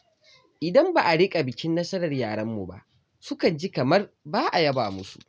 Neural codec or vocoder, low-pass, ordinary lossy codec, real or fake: none; none; none; real